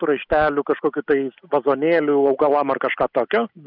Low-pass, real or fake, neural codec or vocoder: 5.4 kHz; real; none